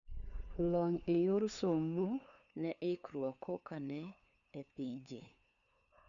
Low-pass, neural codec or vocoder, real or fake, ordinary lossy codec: 7.2 kHz; codec, 16 kHz, 2 kbps, FunCodec, trained on LibriTTS, 25 frames a second; fake; none